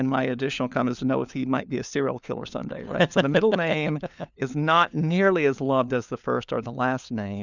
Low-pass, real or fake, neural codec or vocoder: 7.2 kHz; fake; codec, 16 kHz, 4 kbps, FunCodec, trained on LibriTTS, 50 frames a second